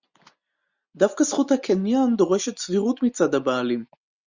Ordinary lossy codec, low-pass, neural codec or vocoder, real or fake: Opus, 64 kbps; 7.2 kHz; none; real